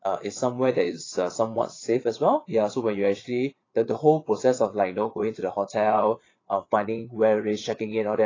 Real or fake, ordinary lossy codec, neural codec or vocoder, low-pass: fake; AAC, 32 kbps; vocoder, 22.05 kHz, 80 mel bands, Vocos; 7.2 kHz